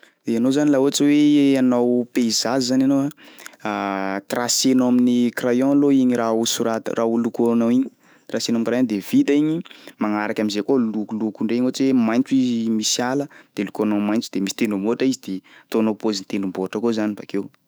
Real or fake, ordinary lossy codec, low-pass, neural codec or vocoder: fake; none; none; autoencoder, 48 kHz, 128 numbers a frame, DAC-VAE, trained on Japanese speech